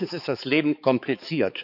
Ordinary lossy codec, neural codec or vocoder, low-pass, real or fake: none; codec, 16 kHz, 4 kbps, X-Codec, HuBERT features, trained on balanced general audio; 5.4 kHz; fake